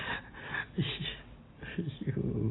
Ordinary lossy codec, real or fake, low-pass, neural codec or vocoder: AAC, 16 kbps; real; 7.2 kHz; none